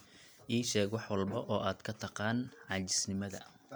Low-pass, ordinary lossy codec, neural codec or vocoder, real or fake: none; none; none; real